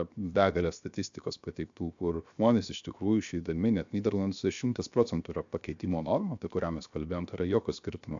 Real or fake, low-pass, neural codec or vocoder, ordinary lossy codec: fake; 7.2 kHz; codec, 16 kHz, 0.7 kbps, FocalCodec; AAC, 64 kbps